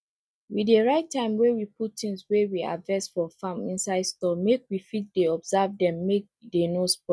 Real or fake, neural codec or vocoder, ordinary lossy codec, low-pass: real; none; none; none